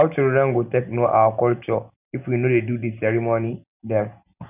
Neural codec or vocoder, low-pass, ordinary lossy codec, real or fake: none; 3.6 kHz; none; real